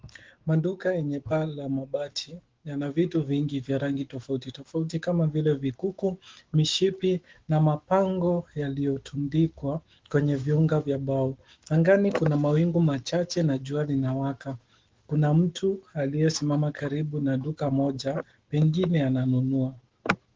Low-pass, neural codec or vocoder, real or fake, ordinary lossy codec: 7.2 kHz; autoencoder, 48 kHz, 128 numbers a frame, DAC-VAE, trained on Japanese speech; fake; Opus, 16 kbps